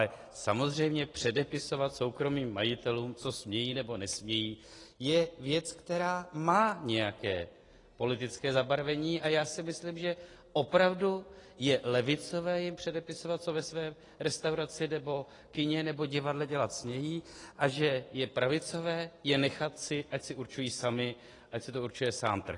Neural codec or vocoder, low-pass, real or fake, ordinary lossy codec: none; 10.8 kHz; real; AAC, 32 kbps